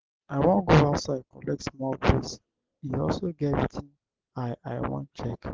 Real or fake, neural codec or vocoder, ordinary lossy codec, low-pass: real; none; Opus, 16 kbps; 7.2 kHz